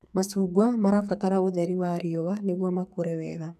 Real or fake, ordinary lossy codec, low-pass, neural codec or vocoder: fake; none; 14.4 kHz; codec, 44.1 kHz, 2.6 kbps, SNAC